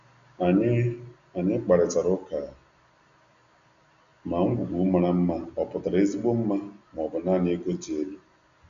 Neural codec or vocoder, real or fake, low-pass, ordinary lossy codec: none; real; 7.2 kHz; Opus, 64 kbps